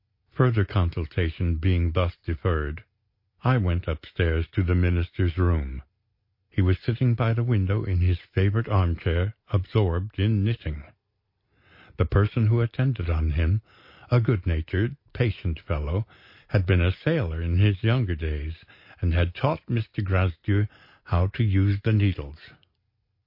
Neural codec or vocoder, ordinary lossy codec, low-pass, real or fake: none; MP3, 32 kbps; 5.4 kHz; real